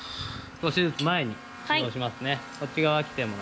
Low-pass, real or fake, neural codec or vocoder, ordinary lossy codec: none; real; none; none